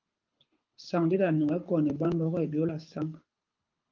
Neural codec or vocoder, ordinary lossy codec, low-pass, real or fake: codec, 24 kHz, 6 kbps, HILCodec; Opus, 24 kbps; 7.2 kHz; fake